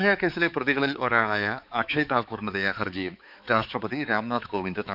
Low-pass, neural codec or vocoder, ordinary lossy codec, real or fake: 5.4 kHz; codec, 16 kHz, 4 kbps, X-Codec, HuBERT features, trained on balanced general audio; none; fake